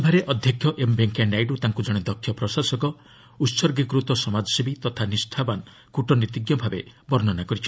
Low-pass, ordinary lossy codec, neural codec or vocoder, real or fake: none; none; none; real